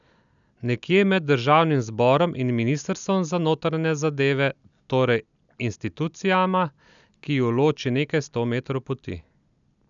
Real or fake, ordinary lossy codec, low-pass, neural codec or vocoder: real; none; 7.2 kHz; none